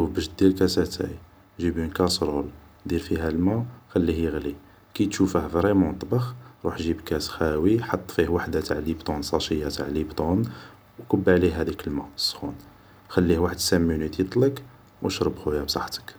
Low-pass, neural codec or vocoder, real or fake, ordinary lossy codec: none; none; real; none